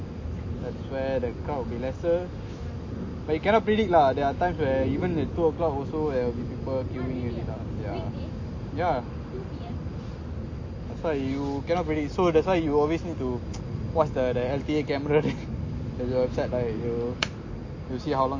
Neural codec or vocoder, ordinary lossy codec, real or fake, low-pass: none; MP3, 32 kbps; real; 7.2 kHz